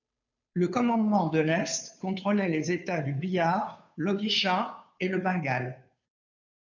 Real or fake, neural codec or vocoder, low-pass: fake; codec, 16 kHz, 2 kbps, FunCodec, trained on Chinese and English, 25 frames a second; 7.2 kHz